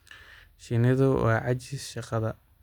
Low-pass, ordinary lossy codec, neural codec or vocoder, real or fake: 19.8 kHz; none; none; real